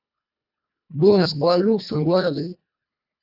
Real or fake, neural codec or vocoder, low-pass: fake; codec, 24 kHz, 1.5 kbps, HILCodec; 5.4 kHz